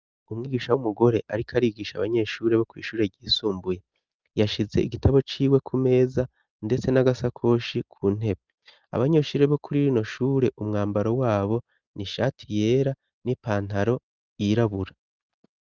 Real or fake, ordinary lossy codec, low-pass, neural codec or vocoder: real; Opus, 32 kbps; 7.2 kHz; none